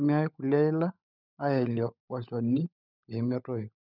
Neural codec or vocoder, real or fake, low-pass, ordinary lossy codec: codec, 16 kHz, 16 kbps, FunCodec, trained on LibriTTS, 50 frames a second; fake; 5.4 kHz; none